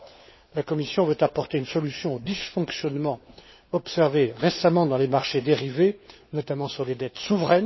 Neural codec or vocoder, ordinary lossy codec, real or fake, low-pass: codec, 16 kHz, 4 kbps, FunCodec, trained on LibriTTS, 50 frames a second; MP3, 24 kbps; fake; 7.2 kHz